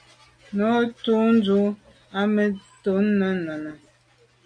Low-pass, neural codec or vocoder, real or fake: 9.9 kHz; none; real